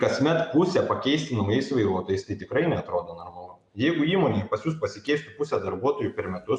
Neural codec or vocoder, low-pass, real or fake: none; 10.8 kHz; real